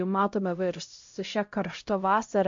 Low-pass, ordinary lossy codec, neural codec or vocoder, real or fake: 7.2 kHz; MP3, 48 kbps; codec, 16 kHz, 0.5 kbps, X-Codec, HuBERT features, trained on LibriSpeech; fake